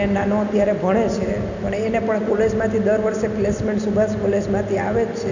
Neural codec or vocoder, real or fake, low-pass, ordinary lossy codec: none; real; 7.2 kHz; none